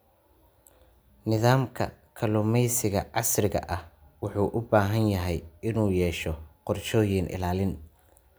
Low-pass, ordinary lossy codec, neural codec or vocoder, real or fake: none; none; none; real